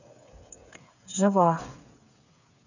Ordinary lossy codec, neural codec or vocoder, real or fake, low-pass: none; codec, 16 kHz, 8 kbps, FreqCodec, smaller model; fake; 7.2 kHz